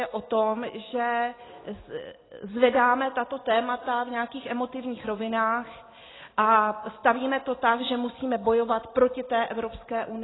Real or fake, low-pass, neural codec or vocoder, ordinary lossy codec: real; 7.2 kHz; none; AAC, 16 kbps